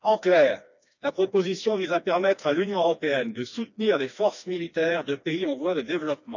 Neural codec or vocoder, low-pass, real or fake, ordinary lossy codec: codec, 16 kHz, 2 kbps, FreqCodec, smaller model; 7.2 kHz; fake; none